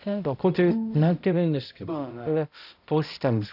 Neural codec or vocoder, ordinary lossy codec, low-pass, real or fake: codec, 16 kHz, 0.5 kbps, X-Codec, HuBERT features, trained on balanced general audio; none; 5.4 kHz; fake